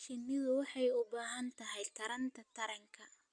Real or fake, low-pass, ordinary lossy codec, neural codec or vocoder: real; 9.9 kHz; none; none